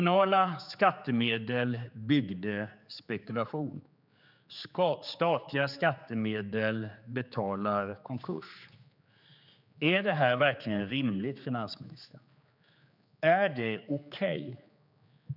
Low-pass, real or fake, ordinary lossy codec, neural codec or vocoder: 5.4 kHz; fake; none; codec, 16 kHz, 4 kbps, X-Codec, HuBERT features, trained on general audio